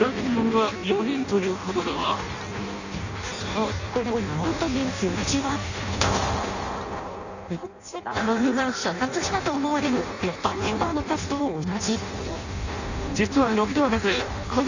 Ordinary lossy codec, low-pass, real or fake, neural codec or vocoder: none; 7.2 kHz; fake; codec, 16 kHz in and 24 kHz out, 0.6 kbps, FireRedTTS-2 codec